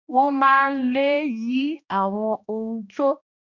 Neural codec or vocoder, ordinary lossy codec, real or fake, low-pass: codec, 16 kHz, 1 kbps, X-Codec, HuBERT features, trained on balanced general audio; none; fake; 7.2 kHz